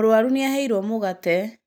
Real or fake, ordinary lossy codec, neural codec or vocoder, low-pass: real; none; none; none